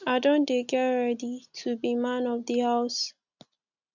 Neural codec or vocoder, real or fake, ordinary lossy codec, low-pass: none; real; none; 7.2 kHz